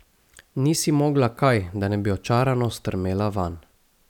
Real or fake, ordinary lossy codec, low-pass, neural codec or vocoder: real; none; 19.8 kHz; none